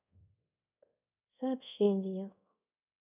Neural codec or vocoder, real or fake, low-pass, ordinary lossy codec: codec, 24 kHz, 0.5 kbps, DualCodec; fake; 3.6 kHz; none